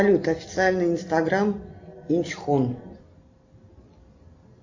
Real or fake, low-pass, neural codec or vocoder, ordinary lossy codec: real; 7.2 kHz; none; AAC, 48 kbps